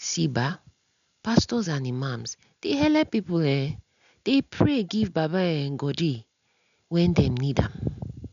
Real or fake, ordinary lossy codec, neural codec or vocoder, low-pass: real; none; none; 7.2 kHz